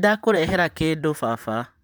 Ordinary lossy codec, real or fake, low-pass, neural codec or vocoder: none; fake; none; vocoder, 44.1 kHz, 128 mel bands, Pupu-Vocoder